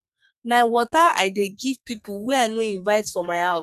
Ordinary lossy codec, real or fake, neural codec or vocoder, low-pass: none; fake; codec, 32 kHz, 1.9 kbps, SNAC; 14.4 kHz